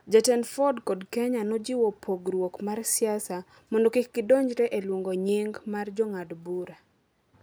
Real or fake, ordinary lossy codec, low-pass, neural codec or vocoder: real; none; none; none